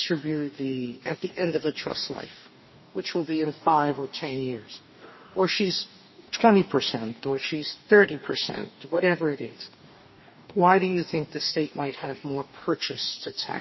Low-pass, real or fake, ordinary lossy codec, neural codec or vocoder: 7.2 kHz; fake; MP3, 24 kbps; codec, 44.1 kHz, 2.6 kbps, DAC